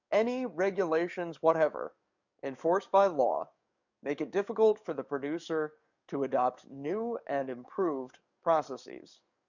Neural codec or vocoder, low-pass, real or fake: codec, 44.1 kHz, 7.8 kbps, DAC; 7.2 kHz; fake